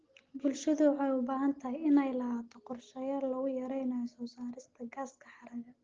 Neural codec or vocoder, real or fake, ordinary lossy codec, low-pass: none; real; Opus, 16 kbps; 7.2 kHz